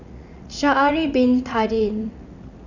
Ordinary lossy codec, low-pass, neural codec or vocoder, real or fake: none; 7.2 kHz; vocoder, 44.1 kHz, 80 mel bands, Vocos; fake